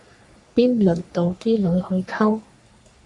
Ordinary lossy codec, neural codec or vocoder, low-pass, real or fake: AAC, 64 kbps; codec, 44.1 kHz, 3.4 kbps, Pupu-Codec; 10.8 kHz; fake